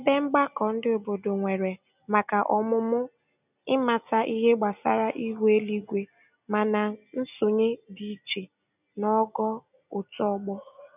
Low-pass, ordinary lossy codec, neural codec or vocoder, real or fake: 3.6 kHz; none; none; real